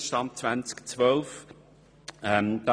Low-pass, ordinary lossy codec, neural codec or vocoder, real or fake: none; none; none; real